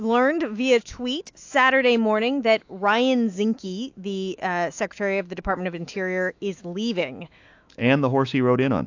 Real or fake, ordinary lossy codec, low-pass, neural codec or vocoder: fake; AAC, 48 kbps; 7.2 kHz; autoencoder, 48 kHz, 128 numbers a frame, DAC-VAE, trained on Japanese speech